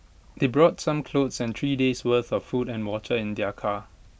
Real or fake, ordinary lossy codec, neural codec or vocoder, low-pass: real; none; none; none